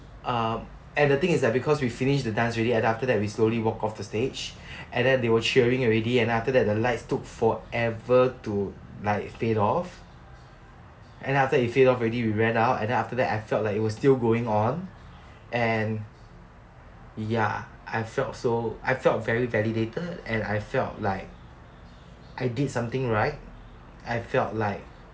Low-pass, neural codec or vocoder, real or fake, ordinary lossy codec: none; none; real; none